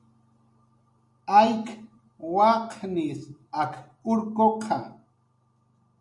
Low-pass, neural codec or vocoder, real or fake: 10.8 kHz; none; real